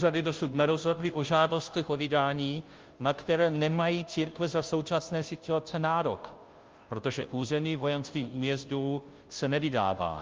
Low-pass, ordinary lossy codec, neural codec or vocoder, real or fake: 7.2 kHz; Opus, 32 kbps; codec, 16 kHz, 0.5 kbps, FunCodec, trained on Chinese and English, 25 frames a second; fake